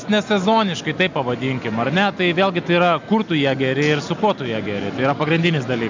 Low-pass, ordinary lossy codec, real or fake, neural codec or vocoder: 7.2 kHz; MP3, 64 kbps; real; none